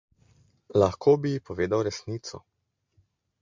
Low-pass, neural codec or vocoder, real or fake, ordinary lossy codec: 7.2 kHz; none; real; MP3, 64 kbps